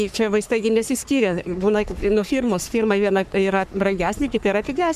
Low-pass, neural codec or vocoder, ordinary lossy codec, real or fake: 14.4 kHz; codec, 44.1 kHz, 3.4 kbps, Pupu-Codec; MP3, 96 kbps; fake